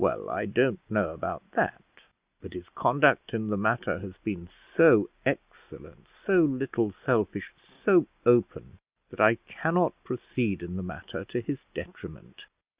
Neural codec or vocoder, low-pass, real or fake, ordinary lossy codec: none; 3.6 kHz; real; Opus, 64 kbps